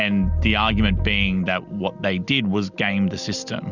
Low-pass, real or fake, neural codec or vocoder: 7.2 kHz; real; none